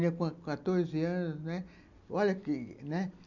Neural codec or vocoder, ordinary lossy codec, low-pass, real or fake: none; none; 7.2 kHz; real